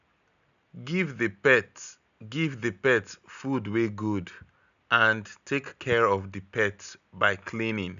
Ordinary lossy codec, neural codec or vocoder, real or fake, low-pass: none; none; real; 7.2 kHz